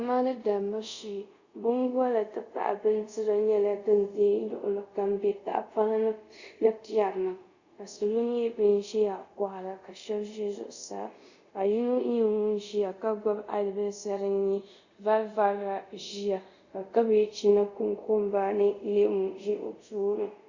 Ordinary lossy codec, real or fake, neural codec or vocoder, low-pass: Opus, 64 kbps; fake; codec, 24 kHz, 0.5 kbps, DualCodec; 7.2 kHz